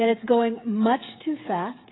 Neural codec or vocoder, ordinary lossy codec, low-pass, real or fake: codec, 16 kHz, 16 kbps, FreqCodec, smaller model; AAC, 16 kbps; 7.2 kHz; fake